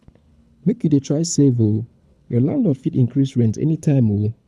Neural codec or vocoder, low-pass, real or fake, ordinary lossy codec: codec, 24 kHz, 6 kbps, HILCodec; none; fake; none